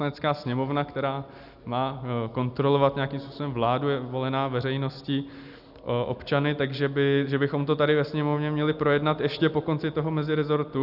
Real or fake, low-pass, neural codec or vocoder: real; 5.4 kHz; none